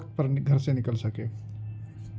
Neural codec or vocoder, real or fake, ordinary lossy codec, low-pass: none; real; none; none